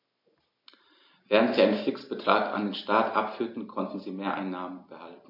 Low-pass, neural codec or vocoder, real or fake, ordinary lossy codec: 5.4 kHz; none; real; MP3, 32 kbps